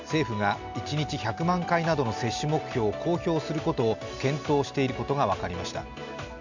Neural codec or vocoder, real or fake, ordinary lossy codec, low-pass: none; real; none; 7.2 kHz